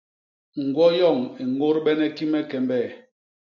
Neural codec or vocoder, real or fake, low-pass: none; real; 7.2 kHz